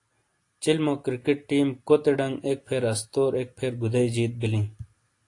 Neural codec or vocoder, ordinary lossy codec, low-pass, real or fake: none; AAC, 48 kbps; 10.8 kHz; real